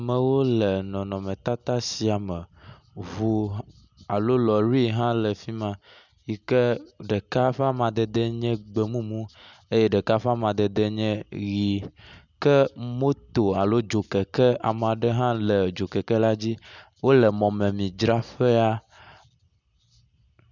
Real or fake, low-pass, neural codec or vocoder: real; 7.2 kHz; none